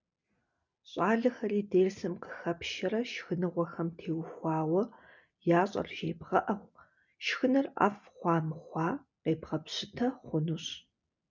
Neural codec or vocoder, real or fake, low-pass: none; real; 7.2 kHz